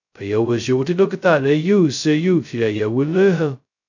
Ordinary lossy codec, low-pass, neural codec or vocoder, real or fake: none; 7.2 kHz; codec, 16 kHz, 0.2 kbps, FocalCodec; fake